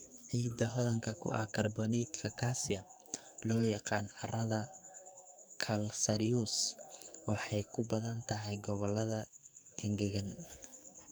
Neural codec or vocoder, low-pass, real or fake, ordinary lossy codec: codec, 44.1 kHz, 2.6 kbps, SNAC; none; fake; none